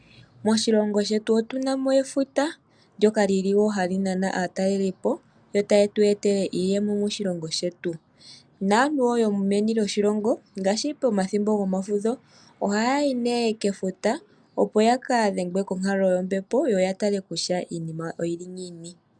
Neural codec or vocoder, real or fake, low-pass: none; real; 9.9 kHz